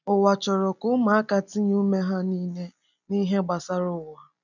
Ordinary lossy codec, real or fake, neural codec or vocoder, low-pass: none; real; none; 7.2 kHz